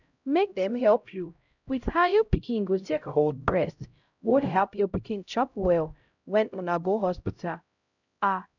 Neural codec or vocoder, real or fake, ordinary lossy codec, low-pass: codec, 16 kHz, 0.5 kbps, X-Codec, HuBERT features, trained on LibriSpeech; fake; none; 7.2 kHz